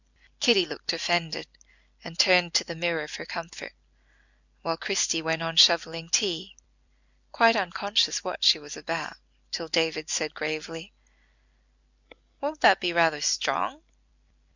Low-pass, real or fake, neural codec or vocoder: 7.2 kHz; real; none